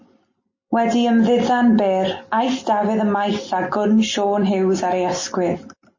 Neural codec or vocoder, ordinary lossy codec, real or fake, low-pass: none; MP3, 32 kbps; real; 7.2 kHz